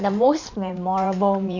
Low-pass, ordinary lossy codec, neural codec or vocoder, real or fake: 7.2 kHz; none; vocoder, 44.1 kHz, 128 mel bands every 512 samples, BigVGAN v2; fake